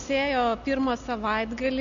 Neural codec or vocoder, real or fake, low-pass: none; real; 7.2 kHz